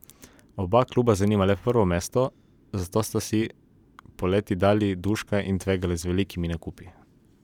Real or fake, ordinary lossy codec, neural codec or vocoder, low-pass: fake; none; vocoder, 44.1 kHz, 128 mel bands every 512 samples, BigVGAN v2; 19.8 kHz